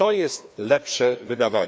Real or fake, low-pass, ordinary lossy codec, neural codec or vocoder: fake; none; none; codec, 16 kHz, 2 kbps, FreqCodec, larger model